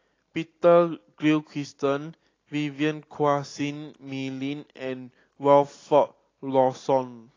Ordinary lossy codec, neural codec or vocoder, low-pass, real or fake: AAC, 32 kbps; none; 7.2 kHz; real